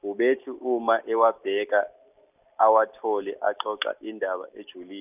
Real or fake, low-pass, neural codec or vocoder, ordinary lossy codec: real; 3.6 kHz; none; none